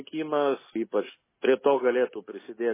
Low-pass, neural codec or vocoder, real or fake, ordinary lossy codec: 3.6 kHz; none; real; MP3, 16 kbps